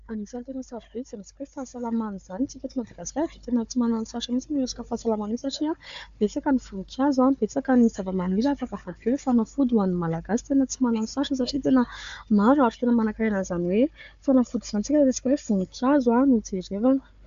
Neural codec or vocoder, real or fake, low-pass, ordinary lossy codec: codec, 16 kHz, 4 kbps, FunCodec, trained on Chinese and English, 50 frames a second; fake; 7.2 kHz; AAC, 64 kbps